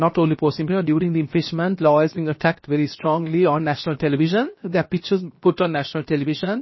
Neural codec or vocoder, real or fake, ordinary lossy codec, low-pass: codec, 16 kHz, 0.7 kbps, FocalCodec; fake; MP3, 24 kbps; 7.2 kHz